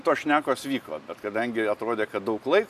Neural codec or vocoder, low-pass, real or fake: none; 14.4 kHz; real